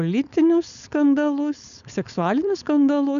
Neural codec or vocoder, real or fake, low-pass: codec, 16 kHz, 4.8 kbps, FACodec; fake; 7.2 kHz